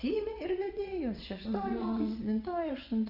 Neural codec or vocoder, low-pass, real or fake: vocoder, 22.05 kHz, 80 mel bands, WaveNeXt; 5.4 kHz; fake